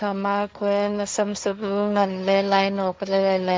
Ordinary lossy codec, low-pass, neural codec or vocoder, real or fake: none; none; codec, 16 kHz, 1.1 kbps, Voila-Tokenizer; fake